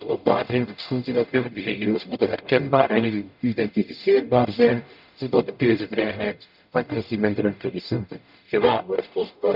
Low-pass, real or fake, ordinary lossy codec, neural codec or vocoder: 5.4 kHz; fake; none; codec, 44.1 kHz, 0.9 kbps, DAC